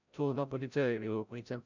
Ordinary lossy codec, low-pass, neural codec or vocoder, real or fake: none; 7.2 kHz; codec, 16 kHz, 0.5 kbps, FreqCodec, larger model; fake